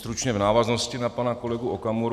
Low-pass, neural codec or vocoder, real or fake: 14.4 kHz; none; real